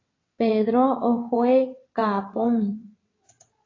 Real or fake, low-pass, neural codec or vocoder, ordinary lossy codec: fake; 7.2 kHz; vocoder, 22.05 kHz, 80 mel bands, WaveNeXt; AAC, 32 kbps